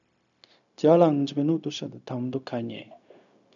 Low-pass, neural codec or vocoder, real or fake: 7.2 kHz; codec, 16 kHz, 0.4 kbps, LongCat-Audio-Codec; fake